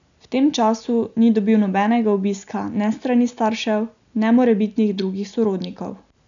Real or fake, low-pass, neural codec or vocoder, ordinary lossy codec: real; 7.2 kHz; none; MP3, 96 kbps